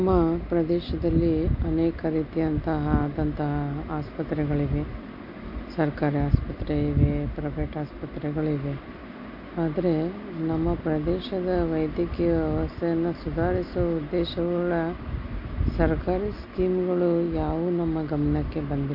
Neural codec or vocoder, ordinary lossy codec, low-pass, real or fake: none; MP3, 32 kbps; 5.4 kHz; real